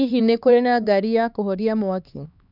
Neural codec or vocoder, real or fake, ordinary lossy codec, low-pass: codec, 16 kHz, 4 kbps, X-Codec, HuBERT features, trained on LibriSpeech; fake; none; 5.4 kHz